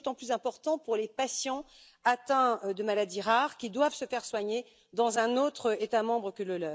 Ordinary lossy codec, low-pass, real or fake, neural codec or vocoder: none; none; real; none